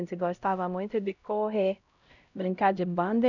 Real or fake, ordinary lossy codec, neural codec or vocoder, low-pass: fake; none; codec, 16 kHz, 0.5 kbps, X-Codec, HuBERT features, trained on LibriSpeech; 7.2 kHz